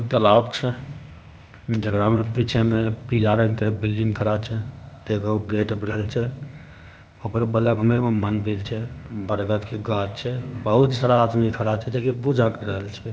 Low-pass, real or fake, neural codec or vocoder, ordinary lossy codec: none; fake; codec, 16 kHz, 0.8 kbps, ZipCodec; none